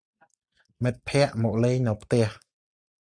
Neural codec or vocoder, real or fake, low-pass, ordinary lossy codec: none; real; 9.9 kHz; Opus, 64 kbps